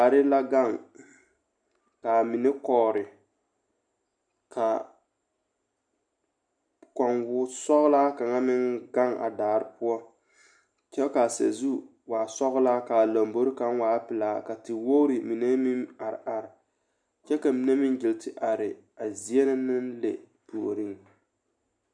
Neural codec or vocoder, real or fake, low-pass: none; real; 9.9 kHz